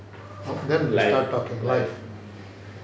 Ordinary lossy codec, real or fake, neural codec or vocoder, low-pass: none; real; none; none